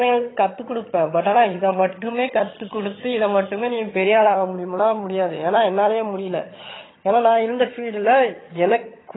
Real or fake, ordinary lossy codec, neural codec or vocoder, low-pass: fake; AAC, 16 kbps; vocoder, 22.05 kHz, 80 mel bands, HiFi-GAN; 7.2 kHz